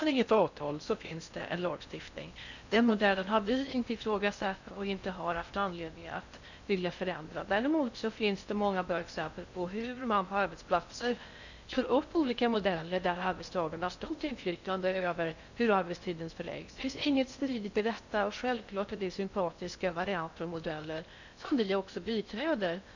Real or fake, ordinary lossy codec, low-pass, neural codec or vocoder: fake; none; 7.2 kHz; codec, 16 kHz in and 24 kHz out, 0.6 kbps, FocalCodec, streaming, 4096 codes